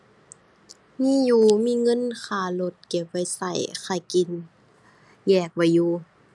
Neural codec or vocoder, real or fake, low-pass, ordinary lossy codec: none; real; none; none